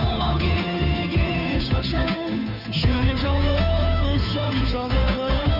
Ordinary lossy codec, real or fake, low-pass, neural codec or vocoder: none; fake; 5.4 kHz; codec, 16 kHz, 2 kbps, FunCodec, trained on Chinese and English, 25 frames a second